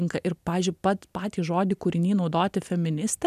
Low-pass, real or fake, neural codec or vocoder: 14.4 kHz; real; none